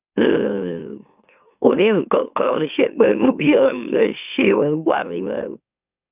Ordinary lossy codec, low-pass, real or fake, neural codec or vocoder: none; 3.6 kHz; fake; autoencoder, 44.1 kHz, a latent of 192 numbers a frame, MeloTTS